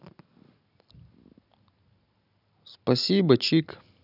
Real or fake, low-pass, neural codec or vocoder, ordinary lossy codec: real; 5.4 kHz; none; none